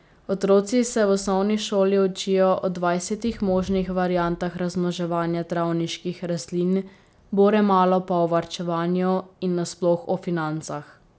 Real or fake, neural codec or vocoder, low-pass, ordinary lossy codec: real; none; none; none